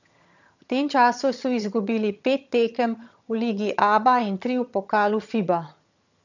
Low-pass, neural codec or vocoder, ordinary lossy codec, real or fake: 7.2 kHz; vocoder, 22.05 kHz, 80 mel bands, HiFi-GAN; none; fake